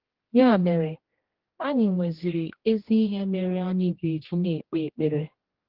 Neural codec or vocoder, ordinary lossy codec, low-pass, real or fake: codec, 16 kHz, 1 kbps, X-Codec, HuBERT features, trained on general audio; Opus, 16 kbps; 5.4 kHz; fake